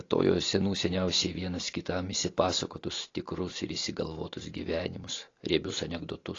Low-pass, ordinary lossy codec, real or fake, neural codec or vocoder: 7.2 kHz; AAC, 32 kbps; real; none